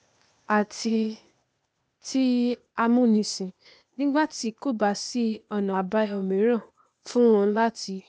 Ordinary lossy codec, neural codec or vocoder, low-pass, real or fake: none; codec, 16 kHz, 0.8 kbps, ZipCodec; none; fake